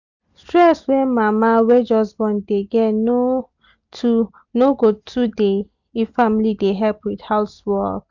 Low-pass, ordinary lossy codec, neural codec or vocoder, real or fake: 7.2 kHz; none; none; real